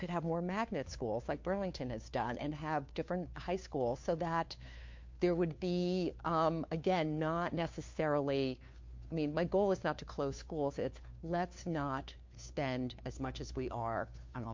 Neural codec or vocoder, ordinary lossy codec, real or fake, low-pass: codec, 16 kHz, 2 kbps, FunCodec, trained on Chinese and English, 25 frames a second; MP3, 48 kbps; fake; 7.2 kHz